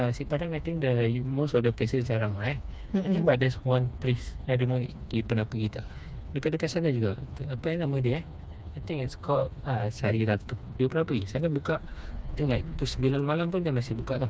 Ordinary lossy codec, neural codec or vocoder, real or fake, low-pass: none; codec, 16 kHz, 2 kbps, FreqCodec, smaller model; fake; none